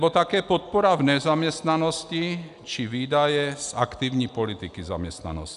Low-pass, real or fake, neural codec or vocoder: 10.8 kHz; real; none